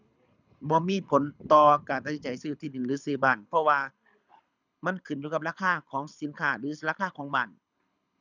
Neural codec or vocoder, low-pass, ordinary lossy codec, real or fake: codec, 24 kHz, 6 kbps, HILCodec; 7.2 kHz; none; fake